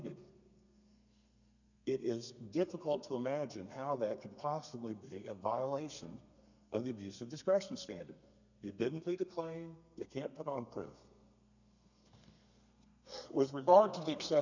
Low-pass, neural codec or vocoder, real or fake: 7.2 kHz; codec, 32 kHz, 1.9 kbps, SNAC; fake